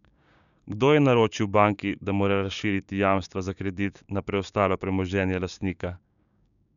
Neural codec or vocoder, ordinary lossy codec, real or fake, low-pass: codec, 16 kHz, 6 kbps, DAC; none; fake; 7.2 kHz